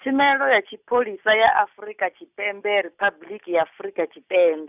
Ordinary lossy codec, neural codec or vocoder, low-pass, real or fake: none; none; 3.6 kHz; real